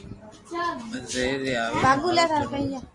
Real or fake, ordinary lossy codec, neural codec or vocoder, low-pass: real; Opus, 64 kbps; none; 10.8 kHz